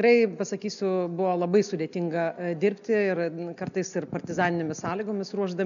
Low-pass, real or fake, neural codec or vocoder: 7.2 kHz; real; none